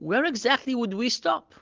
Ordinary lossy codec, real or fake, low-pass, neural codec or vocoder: Opus, 16 kbps; real; 7.2 kHz; none